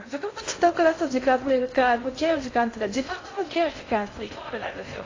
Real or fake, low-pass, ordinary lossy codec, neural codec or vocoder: fake; 7.2 kHz; AAC, 32 kbps; codec, 16 kHz in and 24 kHz out, 0.6 kbps, FocalCodec, streaming, 2048 codes